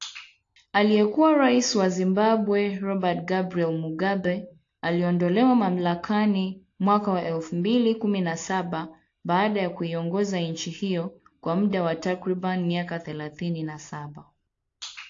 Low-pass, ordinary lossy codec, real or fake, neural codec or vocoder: 7.2 kHz; AAC, 48 kbps; real; none